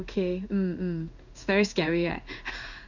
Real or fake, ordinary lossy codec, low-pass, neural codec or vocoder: fake; none; 7.2 kHz; codec, 16 kHz in and 24 kHz out, 1 kbps, XY-Tokenizer